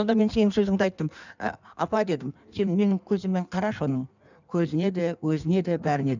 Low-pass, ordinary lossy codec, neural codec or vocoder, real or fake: 7.2 kHz; none; codec, 16 kHz in and 24 kHz out, 1.1 kbps, FireRedTTS-2 codec; fake